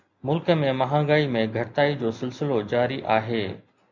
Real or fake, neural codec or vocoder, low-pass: real; none; 7.2 kHz